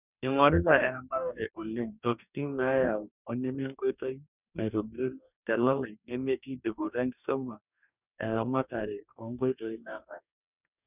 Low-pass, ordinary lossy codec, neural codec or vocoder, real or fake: 3.6 kHz; none; codec, 44.1 kHz, 2.6 kbps, DAC; fake